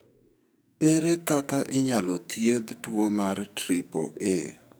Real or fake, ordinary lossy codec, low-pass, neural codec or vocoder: fake; none; none; codec, 44.1 kHz, 2.6 kbps, SNAC